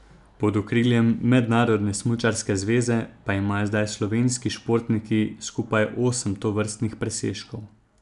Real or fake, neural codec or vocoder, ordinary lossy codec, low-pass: real; none; none; 10.8 kHz